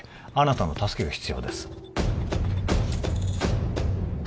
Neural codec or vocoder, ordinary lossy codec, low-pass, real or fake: none; none; none; real